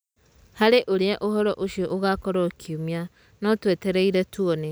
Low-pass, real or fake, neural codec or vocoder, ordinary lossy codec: none; real; none; none